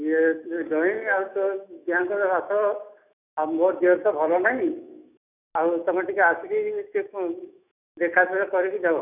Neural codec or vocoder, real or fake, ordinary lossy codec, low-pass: autoencoder, 48 kHz, 128 numbers a frame, DAC-VAE, trained on Japanese speech; fake; none; 3.6 kHz